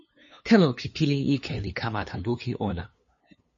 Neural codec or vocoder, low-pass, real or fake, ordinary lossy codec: codec, 16 kHz, 2 kbps, FunCodec, trained on LibriTTS, 25 frames a second; 7.2 kHz; fake; MP3, 32 kbps